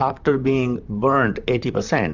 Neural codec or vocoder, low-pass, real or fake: vocoder, 44.1 kHz, 128 mel bands, Pupu-Vocoder; 7.2 kHz; fake